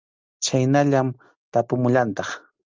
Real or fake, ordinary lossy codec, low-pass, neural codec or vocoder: real; Opus, 32 kbps; 7.2 kHz; none